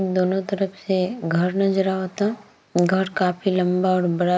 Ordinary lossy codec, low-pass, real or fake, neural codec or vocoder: none; none; real; none